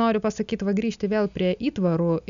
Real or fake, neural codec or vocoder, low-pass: real; none; 7.2 kHz